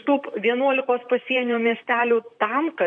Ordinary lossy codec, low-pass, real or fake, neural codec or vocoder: MP3, 96 kbps; 9.9 kHz; fake; vocoder, 44.1 kHz, 128 mel bands, Pupu-Vocoder